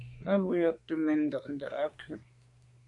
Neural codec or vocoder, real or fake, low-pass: codec, 24 kHz, 1 kbps, SNAC; fake; 10.8 kHz